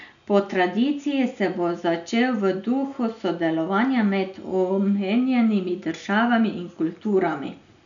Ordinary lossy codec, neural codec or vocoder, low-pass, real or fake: none; none; 7.2 kHz; real